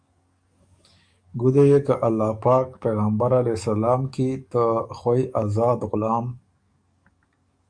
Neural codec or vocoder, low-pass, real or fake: codec, 44.1 kHz, 7.8 kbps, DAC; 9.9 kHz; fake